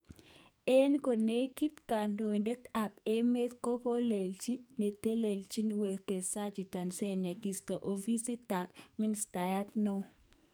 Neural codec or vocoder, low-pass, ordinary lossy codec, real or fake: codec, 44.1 kHz, 2.6 kbps, SNAC; none; none; fake